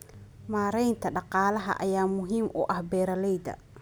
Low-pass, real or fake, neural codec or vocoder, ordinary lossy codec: none; real; none; none